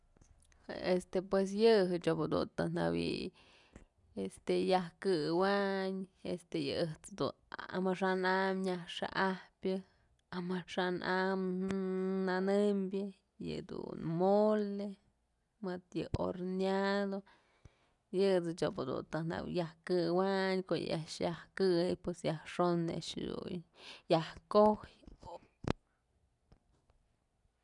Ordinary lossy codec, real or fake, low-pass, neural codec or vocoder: none; real; 10.8 kHz; none